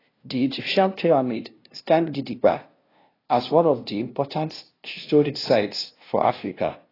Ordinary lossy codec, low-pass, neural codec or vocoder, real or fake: AAC, 24 kbps; 5.4 kHz; codec, 16 kHz, 0.5 kbps, FunCodec, trained on LibriTTS, 25 frames a second; fake